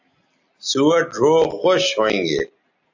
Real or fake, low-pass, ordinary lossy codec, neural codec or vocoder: real; 7.2 kHz; AAC, 48 kbps; none